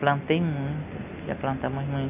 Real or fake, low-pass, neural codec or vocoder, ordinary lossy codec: real; 3.6 kHz; none; none